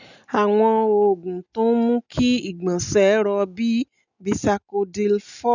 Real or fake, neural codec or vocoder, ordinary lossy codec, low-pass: real; none; none; 7.2 kHz